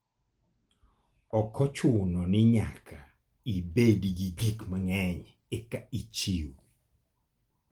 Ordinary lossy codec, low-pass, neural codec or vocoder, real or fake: Opus, 24 kbps; 19.8 kHz; none; real